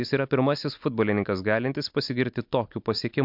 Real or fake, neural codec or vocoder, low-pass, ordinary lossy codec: real; none; 5.4 kHz; MP3, 48 kbps